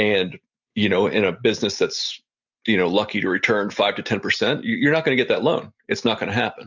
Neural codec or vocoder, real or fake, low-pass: none; real; 7.2 kHz